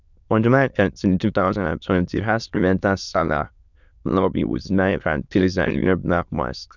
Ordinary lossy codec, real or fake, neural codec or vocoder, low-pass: none; fake; autoencoder, 22.05 kHz, a latent of 192 numbers a frame, VITS, trained on many speakers; 7.2 kHz